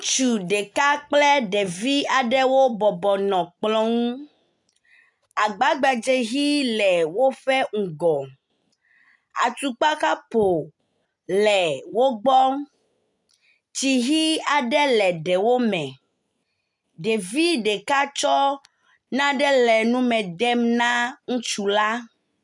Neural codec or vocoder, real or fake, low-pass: none; real; 10.8 kHz